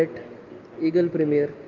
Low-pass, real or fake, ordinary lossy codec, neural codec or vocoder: 7.2 kHz; real; Opus, 24 kbps; none